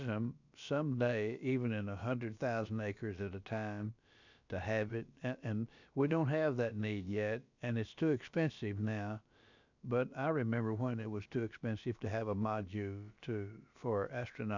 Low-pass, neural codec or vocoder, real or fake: 7.2 kHz; codec, 16 kHz, about 1 kbps, DyCAST, with the encoder's durations; fake